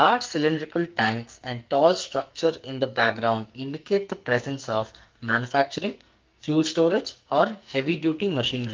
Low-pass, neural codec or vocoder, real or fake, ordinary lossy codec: 7.2 kHz; codec, 44.1 kHz, 2.6 kbps, SNAC; fake; Opus, 32 kbps